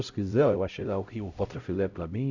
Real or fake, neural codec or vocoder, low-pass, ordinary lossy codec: fake; codec, 16 kHz, 0.5 kbps, X-Codec, HuBERT features, trained on LibriSpeech; 7.2 kHz; none